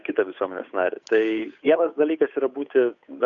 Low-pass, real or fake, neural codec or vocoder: 7.2 kHz; fake; codec, 16 kHz, 8 kbps, FunCodec, trained on Chinese and English, 25 frames a second